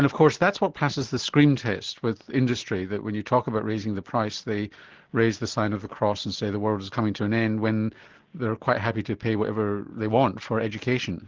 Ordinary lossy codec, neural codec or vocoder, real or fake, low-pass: Opus, 16 kbps; none; real; 7.2 kHz